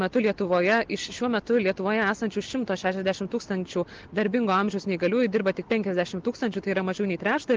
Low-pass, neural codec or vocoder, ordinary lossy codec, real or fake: 7.2 kHz; none; Opus, 16 kbps; real